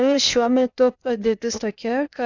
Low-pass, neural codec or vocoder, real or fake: 7.2 kHz; codec, 16 kHz, 0.8 kbps, ZipCodec; fake